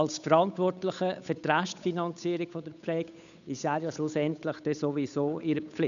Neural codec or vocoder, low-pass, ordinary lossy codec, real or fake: codec, 16 kHz, 8 kbps, FunCodec, trained on Chinese and English, 25 frames a second; 7.2 kHz; none; fake